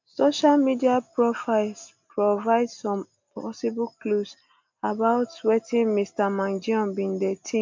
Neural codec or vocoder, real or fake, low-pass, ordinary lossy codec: none; real; 7.2 kHz; MP3, 64 kbps